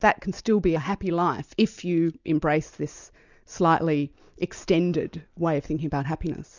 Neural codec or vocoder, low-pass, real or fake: none; 7.2 kHz; real